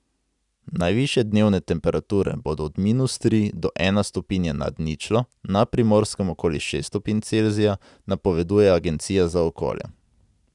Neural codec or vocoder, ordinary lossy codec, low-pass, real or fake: none; none; 10.8 kHz; real